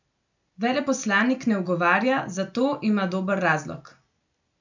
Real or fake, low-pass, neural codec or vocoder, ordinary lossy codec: real; 7.2 kHz; none; none